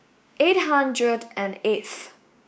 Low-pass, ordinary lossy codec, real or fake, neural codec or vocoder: none; none; fake; codec, 16 kHz, 6 kbps, DAC